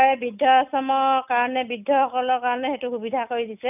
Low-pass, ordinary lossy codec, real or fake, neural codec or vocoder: 3.6 kHz; none; real; none